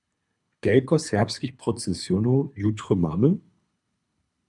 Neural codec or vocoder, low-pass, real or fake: codec, 24 kHz, 3 kbps, HILCodec; 10.8 kHz; fake